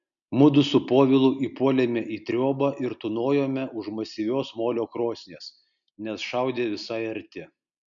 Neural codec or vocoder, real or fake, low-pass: none; real; 7.2 kHz